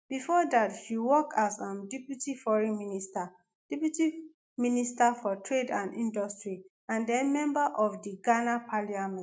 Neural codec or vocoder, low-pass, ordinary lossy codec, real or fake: none; none; none; real